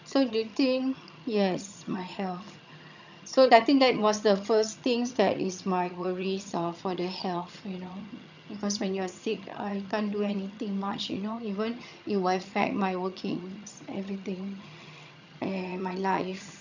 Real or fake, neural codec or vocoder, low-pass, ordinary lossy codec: fake; vocoder, 22.05 kHz, 80 mel bands, HiFi-GAN; 7.2 kHz; none